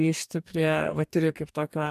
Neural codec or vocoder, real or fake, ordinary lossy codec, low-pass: codec, 44.1 kHz, 2.6 kbps, DAC; fake; MP3, 96 kbps; 14.4 kHz